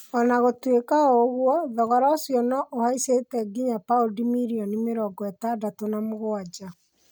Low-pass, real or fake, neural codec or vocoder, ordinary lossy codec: none; real; none; none